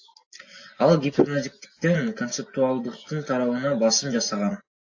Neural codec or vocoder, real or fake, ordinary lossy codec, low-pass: none; real; AAC, 48 kbps; 7.2 kHz